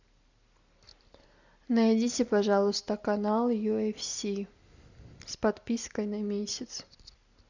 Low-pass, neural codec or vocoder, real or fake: 7.2 kHz; none; real